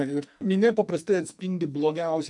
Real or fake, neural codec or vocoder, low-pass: fake; codec, 44.1 kHz, 2.6 kbps, SNAC; 10.8 kHz